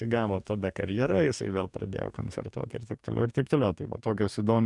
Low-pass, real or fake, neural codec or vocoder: 10.8 kHz; fake; codec, 44.1 kHz, 2.6 kbps, DAC